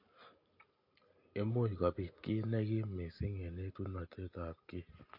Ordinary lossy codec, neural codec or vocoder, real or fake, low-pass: MP3, 32 kbps; none; real; 5.4 kHz